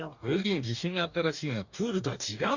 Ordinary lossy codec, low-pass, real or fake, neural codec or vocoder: none; 7.2 kHz; fake; codec, 44.1 kHz, 2.6 kbps, DAC